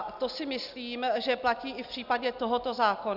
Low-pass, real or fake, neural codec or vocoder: 5.4 kHz; real; none